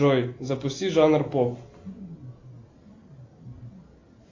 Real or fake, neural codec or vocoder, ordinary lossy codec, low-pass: real; none; AAC, 32 kbps; 7.2 kHz